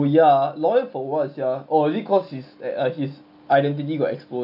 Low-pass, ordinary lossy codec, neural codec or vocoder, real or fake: 5.4 kHz; none; none; real